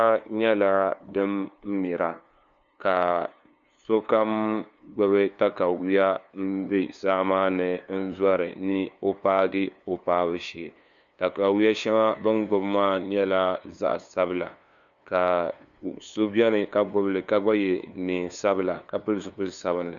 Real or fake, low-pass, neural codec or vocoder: fake; 7.2 kHz; codec, 16 kHz, 2 kbps, FunCodec, trained on LibriTTS, 25 frames a second